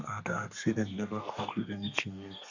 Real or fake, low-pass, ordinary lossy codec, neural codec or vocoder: fake; 7.2 kHz; none; codec, 32 kHz, 1.9 kbps, SNAC